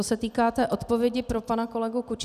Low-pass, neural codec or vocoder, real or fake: 14.4 kHz; none; real